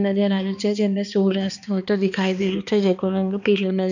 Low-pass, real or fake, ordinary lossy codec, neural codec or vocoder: 7.2 kHz; fake; none; codec, 16 kHz, 2 kbps, X-Codec, HuBERT features, trained on balanced general audio